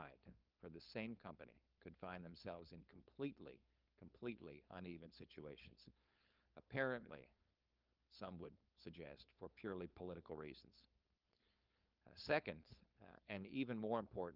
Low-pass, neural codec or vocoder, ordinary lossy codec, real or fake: 5.4 kHz; codec, 16 kHz, 4.8 kbps, FACodec; Opus, 24 kbps; fake